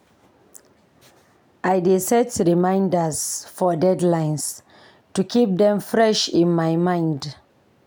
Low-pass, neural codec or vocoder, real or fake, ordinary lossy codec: none; none; real; none